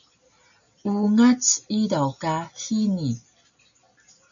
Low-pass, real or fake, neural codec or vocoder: 7.2 kHz; real; none